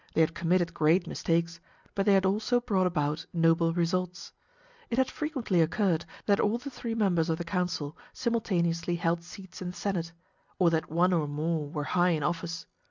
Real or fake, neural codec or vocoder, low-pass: real; none; 7.2 kHz